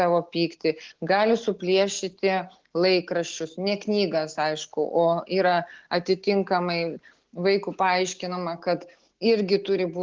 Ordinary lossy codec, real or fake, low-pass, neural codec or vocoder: Opus, 16 kbps; real; 7.2 kHz; none